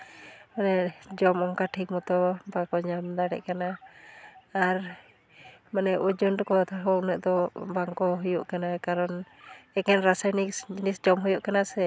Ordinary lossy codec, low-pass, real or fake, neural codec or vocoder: none; none; real; none